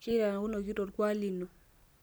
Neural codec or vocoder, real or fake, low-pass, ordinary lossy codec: none; real; none; none